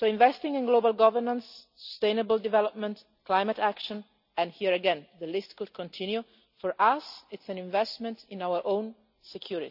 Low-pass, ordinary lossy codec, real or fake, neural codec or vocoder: 5.4 kHz; none; real; none